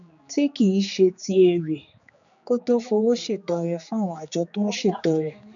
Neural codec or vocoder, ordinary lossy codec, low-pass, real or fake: codec, 16 kHz, 4 kbps, X-Codec, HuBERT features, trained on general audio; none; 7.2 kHz; fake